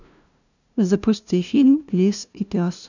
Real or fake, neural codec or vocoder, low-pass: fake; codec, 16 kHz, 0.5 kbps, FunCodec, trained on LibriTTS, 25 frames a second; 7.2 kHz